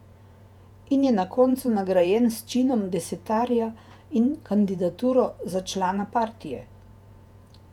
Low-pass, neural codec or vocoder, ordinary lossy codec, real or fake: 19.8 kHz; vocoder, 48 kHz, 128 mel bands, Vocos; none; fake